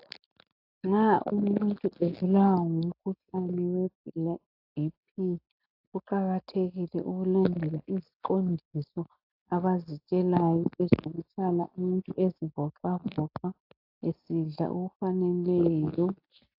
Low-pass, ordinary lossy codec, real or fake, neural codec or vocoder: 5.4 kHz; AAC, 48 kbps; real; none